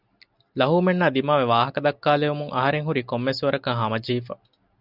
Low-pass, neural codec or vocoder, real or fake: 5.4 kHz; none; real